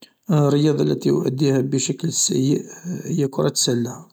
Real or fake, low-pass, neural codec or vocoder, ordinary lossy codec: real; none; none; none